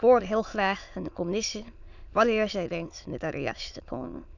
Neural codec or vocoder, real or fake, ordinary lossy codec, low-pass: autoencoder, 22.05 kHz, a latent of 192 numbers a frame, VITS, trained on many speakers; fake; none; 7.2 kHz